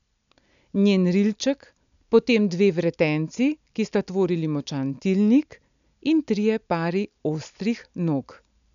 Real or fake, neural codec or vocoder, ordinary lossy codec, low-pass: real; none; none; 7.2 kHz